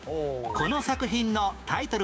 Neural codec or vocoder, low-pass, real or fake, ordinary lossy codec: codec, 16 kHz, 6 kbps, DAC; none; fake; none